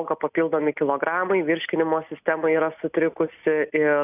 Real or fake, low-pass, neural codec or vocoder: real; 3.6 kHz; none